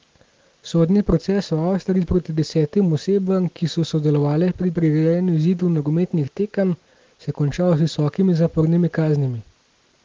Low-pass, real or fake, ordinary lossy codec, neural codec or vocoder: 7.2 kHz; real; Opus, 16 kbps; none